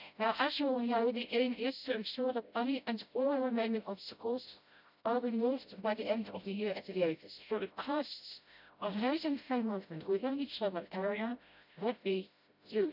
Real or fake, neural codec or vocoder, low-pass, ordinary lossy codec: fake; codec, 16 kHz, 0.5 kbps, FreqCodec, smaller model; 5.4 kHz; none